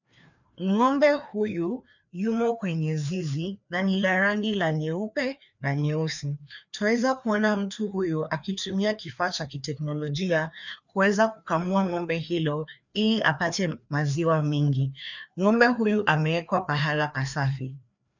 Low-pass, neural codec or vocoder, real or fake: 7.2 kHz; codec, 16 kHz, 2 kbps, FreqCodec, larger model; fake